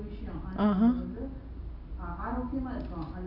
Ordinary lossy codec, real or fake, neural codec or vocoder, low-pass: none; real; none; 5.4 kHz